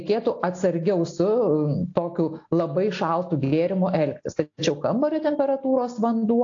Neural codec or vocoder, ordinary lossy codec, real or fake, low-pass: none; AAC, 48 kbps; real; 7.2 kHz